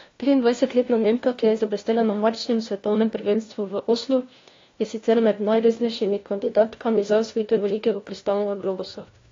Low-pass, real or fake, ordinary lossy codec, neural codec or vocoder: 7.2 kHz; fake; AAC, 32 kbps; codec, 16 kHz, 1 kbps, FunCodec, trained on LibriTTS, 50 frames a second